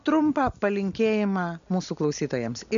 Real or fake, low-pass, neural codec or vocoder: real; 7.2 kHz; none